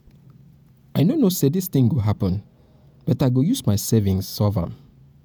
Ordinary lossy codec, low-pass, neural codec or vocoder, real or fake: none; none; vocoder, 48 kHz, 128 mel bands, Vocos; fake